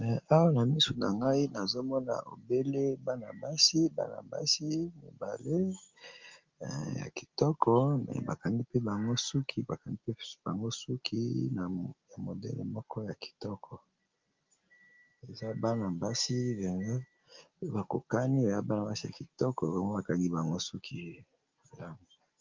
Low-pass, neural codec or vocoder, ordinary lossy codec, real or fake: 7.2 kHz; none; Opus, 32 kbps; real